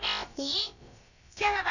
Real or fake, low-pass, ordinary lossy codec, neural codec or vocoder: fake; 7.2 kHz; none; codec, 16 kHz, about 1 kbps, DyCAST, with the encoder's durations